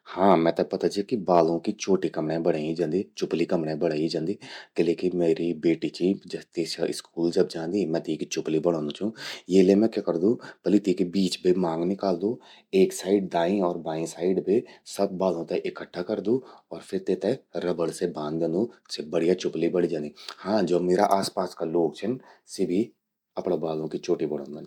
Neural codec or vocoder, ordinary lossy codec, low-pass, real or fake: none; none; 19.8 kHz; real